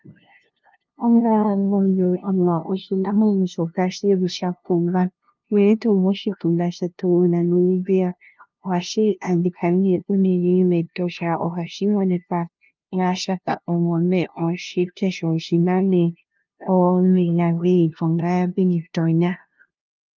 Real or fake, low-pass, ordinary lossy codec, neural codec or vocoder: fake; 7.2 kHz; Opus, 24 kbps; codec, 16 kHz, 0.5 kbps, FunCodec, trained on LibriTTS, 25 frames a second